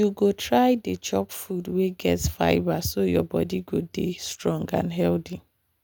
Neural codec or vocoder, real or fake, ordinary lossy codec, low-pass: none; real; none; none